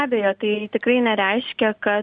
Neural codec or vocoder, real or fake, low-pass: none; real; 9.9 kHz